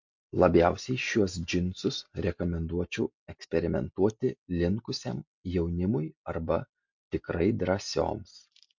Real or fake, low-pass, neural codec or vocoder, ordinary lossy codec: real; 7.2 kHz; none; MP3, 48 kbps